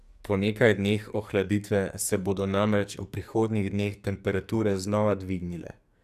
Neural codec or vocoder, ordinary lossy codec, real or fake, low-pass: codec, 44.1 kHz, 2.6 kbps, SNAC; none; fake; 14.4 kHz